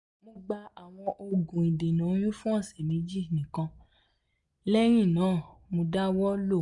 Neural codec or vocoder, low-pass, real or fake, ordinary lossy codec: none; 10.8 kHz; real; none